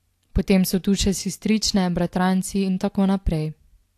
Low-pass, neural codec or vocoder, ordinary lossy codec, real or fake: 14.4 kHz; none; AAC, 64 kbps; real